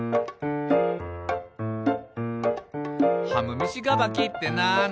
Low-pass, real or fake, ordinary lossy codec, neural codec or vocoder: none; real; none; none